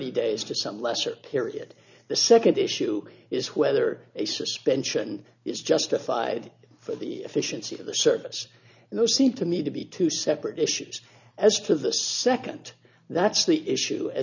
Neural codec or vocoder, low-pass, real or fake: none; 7.2 kHz; real